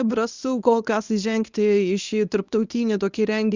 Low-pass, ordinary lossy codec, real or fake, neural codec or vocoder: 7.2 kHz; Opus, 64 kbps; fake; codec, 24 kHz, 0.9 kbps, WavTokenizer, medium speech release version 1